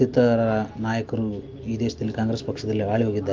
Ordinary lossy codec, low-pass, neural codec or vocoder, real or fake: Opus, 32 kbps; 7.2 kHz; none; real